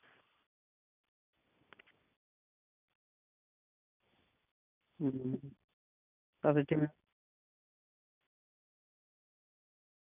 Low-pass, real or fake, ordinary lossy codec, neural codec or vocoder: 3.6 kHz; real; none; none